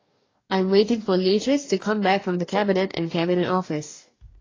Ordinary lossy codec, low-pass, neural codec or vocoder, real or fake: AAC, 32 kbps; 7.2 kHz; codec, 44.1 kHz, 2.6 kbps, DAC; fake